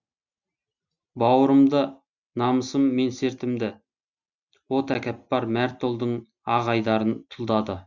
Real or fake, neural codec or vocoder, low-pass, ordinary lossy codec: real; none; 7.2 kHz; Opus, 64 kbps